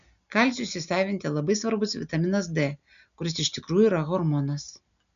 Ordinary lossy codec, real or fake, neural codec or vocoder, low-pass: MP3, 96 kbps; real; none; 7.2 kHz